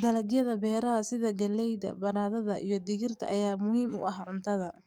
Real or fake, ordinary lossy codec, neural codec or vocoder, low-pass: fake; none; codec, 44.1 kHz, 7.8 kbps, DAC; 19.8 kHz